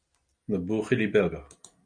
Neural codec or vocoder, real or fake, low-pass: none; real; 9.9 kHz